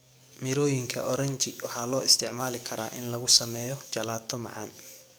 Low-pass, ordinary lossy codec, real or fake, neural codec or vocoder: none; none; fake; codec, 44.1 kHz, 7.8 kbps, DAC